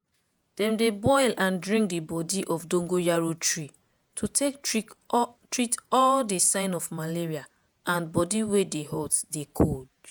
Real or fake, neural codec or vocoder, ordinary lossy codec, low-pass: fake; vocoder, 48 kHz, 128 mel bands, Vocos; none; none